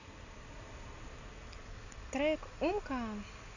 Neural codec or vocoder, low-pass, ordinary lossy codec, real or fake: none; 7.2 kHz; none; real